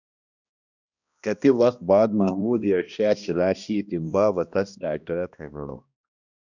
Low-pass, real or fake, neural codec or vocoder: 7.2 kHz; fake; codec, 16 kHz, 1 kbps, X-Codec, HuBERT features, trained on balanced general audio